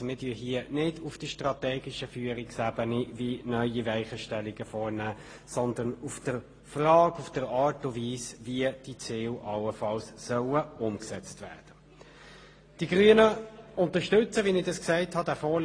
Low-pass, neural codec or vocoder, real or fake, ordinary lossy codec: 9.9 kHz; none; real; AAC, 32 kbps